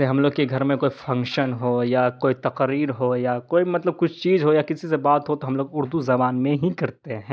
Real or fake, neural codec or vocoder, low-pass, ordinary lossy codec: real; none; none; none